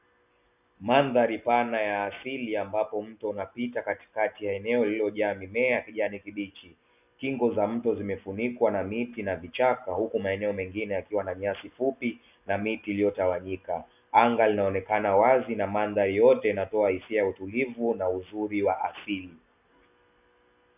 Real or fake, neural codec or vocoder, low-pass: real; none; 3.6 kHz